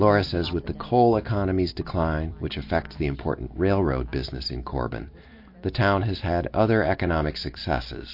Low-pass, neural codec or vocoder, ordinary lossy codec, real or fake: 5.4 kHz; none; MP3, 32 kbps; real